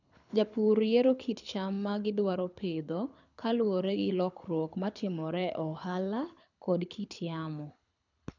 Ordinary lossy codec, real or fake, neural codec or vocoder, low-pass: none; fake; codec, 24 kHz, 6 kbps, HILCodec; 7.2 kHz